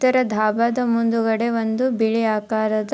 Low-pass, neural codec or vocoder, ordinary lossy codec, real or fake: none; none; none; real